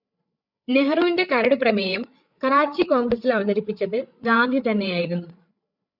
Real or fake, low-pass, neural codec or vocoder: fake; 5.4 kHz; codec, 16 kHz, 8 kbps, FreqCodec, larger model